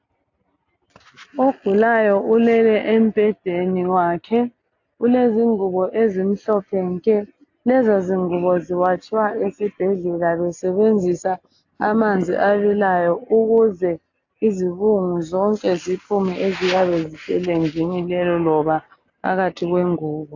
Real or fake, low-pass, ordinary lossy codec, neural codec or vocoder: real; 7.2 kHz; AAC, 48 kbps; none